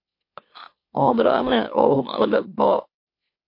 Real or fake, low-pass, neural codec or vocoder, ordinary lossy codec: fake; 5.4 kHz; autoencoder, 44.1 kHz, a latent of 192 numbers a frame, MeloTTS; MP3, 32 kbps